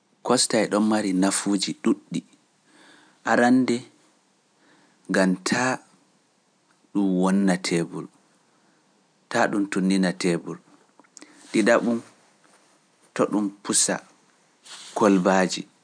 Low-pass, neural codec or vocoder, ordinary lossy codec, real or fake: 9.9 kHz; none; none; real